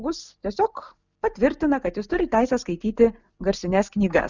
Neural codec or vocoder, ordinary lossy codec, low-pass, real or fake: none; Opus, 64 kbps; 7.2 kHz; real